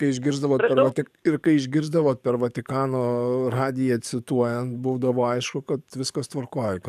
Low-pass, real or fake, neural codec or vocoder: 14.4 kHz; fake; codec, 44.1 kHz, 7.8 kbps, DAC